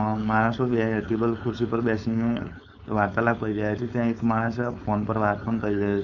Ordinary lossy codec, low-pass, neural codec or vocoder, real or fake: none; 7.2 kHz; codec, 16 kHz, 4.8 kbps, FACodec; fake